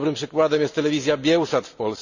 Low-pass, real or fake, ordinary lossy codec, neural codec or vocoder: 7.2 kHz; real; none; none